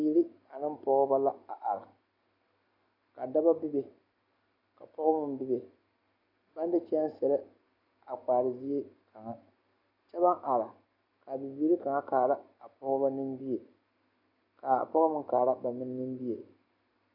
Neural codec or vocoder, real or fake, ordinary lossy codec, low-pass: none; real; AAC, 48 kbps; 5.4 kHz